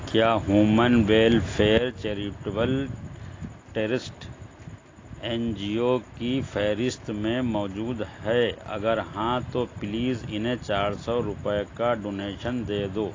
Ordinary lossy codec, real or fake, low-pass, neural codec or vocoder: AAC, 32 kbps; real; 7.2 kHz; none